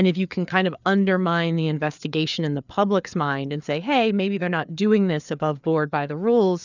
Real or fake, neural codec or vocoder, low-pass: fake; codec, 16 kHz, 4 kbps, FreqCodec, larger model; 7.2 kHz